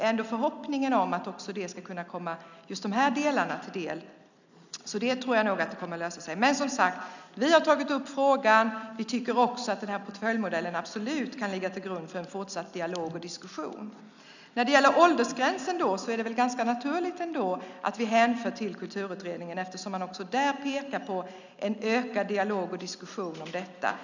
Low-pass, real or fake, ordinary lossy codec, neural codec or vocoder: 7.2 kHz; real; none; none